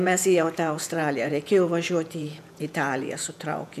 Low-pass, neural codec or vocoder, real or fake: 14.4 kHz; vocoder, 48 kHz, 128 mel bands, Vocos; fake